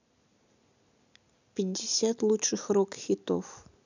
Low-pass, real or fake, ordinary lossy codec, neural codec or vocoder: 7.2 kHz; real; none; none